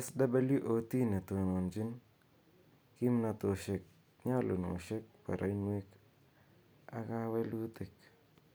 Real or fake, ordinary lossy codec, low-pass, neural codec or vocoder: real; none; none; none